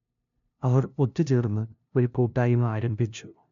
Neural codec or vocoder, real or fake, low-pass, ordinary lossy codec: codec, 16 kHz, 0.5 kbps, FunCodec, trained on LibriTTS, 25 frames a second; fake; 7.2 kHz; none